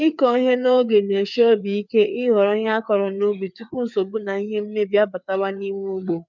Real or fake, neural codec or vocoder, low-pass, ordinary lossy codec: fake; codec, 16 kHz, 4 kbps, FreqCodec, larger model; 7.2 kHz; none